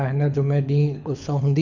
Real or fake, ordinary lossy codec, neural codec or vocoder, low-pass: fake; none; codec, 24 kHz, 6 kbps, HILCodec; 7.2 kHz